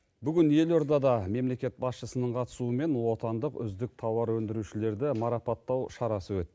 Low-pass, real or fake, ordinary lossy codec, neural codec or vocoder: none; real; none; none